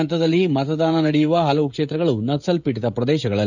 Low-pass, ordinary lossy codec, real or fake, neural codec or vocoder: 7.2 kHz; none; fake; codec, 16 kHz, 16 kbps, FreqCodec, smaller model